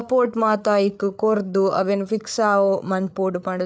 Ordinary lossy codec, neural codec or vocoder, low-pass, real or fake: none; codec, 16 kHz, 8 kbps, FreqCodec, larger model; none; fake